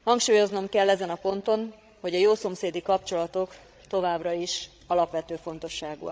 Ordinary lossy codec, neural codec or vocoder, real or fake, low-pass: none; codec, 16 kHz, 16 kbps, FreqCodec, larger model; fake; none